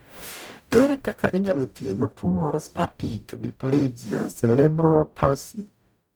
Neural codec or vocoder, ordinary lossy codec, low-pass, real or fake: codec, 44.1 kHz, 0.9 kbps, DAC; none; none; fake